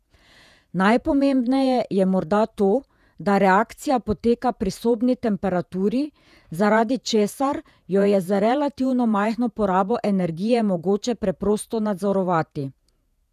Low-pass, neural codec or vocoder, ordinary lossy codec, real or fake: 14.4 kHz; vocoder, 48 kHz, 128 mel bands, Vocos; none; fake